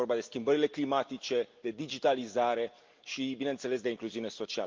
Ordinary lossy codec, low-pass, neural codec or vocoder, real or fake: Opus, 24 kbps; 7.2 kHz; none; real